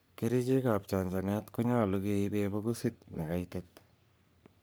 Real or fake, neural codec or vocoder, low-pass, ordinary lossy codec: fake; codec, 44.1 kHz, 3.4 kbps, Pupu-Codec; none; none